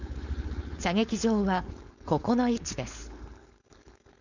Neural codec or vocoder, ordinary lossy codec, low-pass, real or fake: codec, 16 kHz, 4.8 kbps, FACodec; none; 7.2 kHz; fake